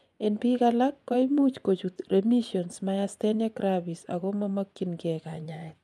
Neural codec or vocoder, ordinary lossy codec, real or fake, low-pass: vocoder, 24 kHz, 100 mel bands, Vocos; none; fake; none